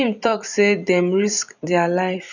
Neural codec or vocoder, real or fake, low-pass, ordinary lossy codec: vocoder, 44.1 kHz, 128 mel bands every 256 samples, BigVGAN v2; fake; 7.2 kHz; none